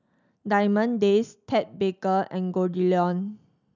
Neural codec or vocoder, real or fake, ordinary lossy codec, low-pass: none; real; none; 7.2 kHz